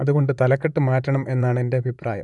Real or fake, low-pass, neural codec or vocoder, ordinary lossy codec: fake; 10.8 kHz; vocoder, 44.1 kHz, 128 mel bands, Pupu-Vocoder; none